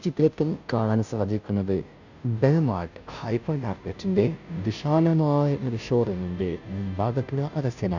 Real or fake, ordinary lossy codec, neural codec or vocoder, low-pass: fake; none; codec, 16 kHz, 0.5 kbps, FunCodec, trained on Chinese and English, 25 frames a second; 7.2 kHz